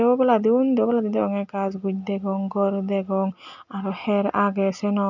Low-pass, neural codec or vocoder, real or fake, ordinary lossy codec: 7.2 kHz; none; real; none